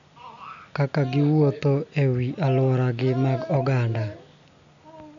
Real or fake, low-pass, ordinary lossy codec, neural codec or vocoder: real; 7.2 kHz; none; none